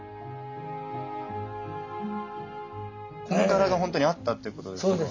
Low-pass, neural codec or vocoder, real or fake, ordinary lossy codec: 7.2 kHz; none; real; MP3, 64 kbps